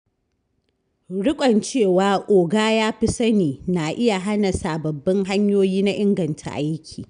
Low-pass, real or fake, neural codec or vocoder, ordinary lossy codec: 9.9 kHz; real; none; none